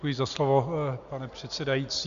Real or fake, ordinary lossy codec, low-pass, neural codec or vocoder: real; AAC, 96 kbps; 7.2 kHz; none